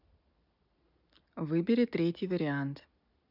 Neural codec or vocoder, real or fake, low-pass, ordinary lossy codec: none; real; 5.4 kHz; none